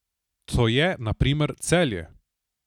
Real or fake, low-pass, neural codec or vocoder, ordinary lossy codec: real; 19.8 kHz; none; none